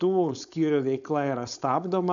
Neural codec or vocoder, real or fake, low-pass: codec, 16 kHz, 4.8 kbps, FACodec; fake; 7.2 kHz